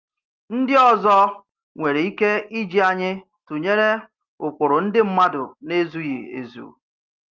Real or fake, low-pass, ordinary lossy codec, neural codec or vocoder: real; 7.2 kHz; Opus, 32 kbps; none